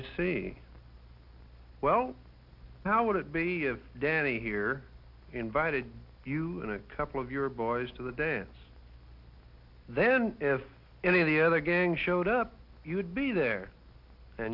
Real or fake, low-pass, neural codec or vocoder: real; 5.4 kHz; none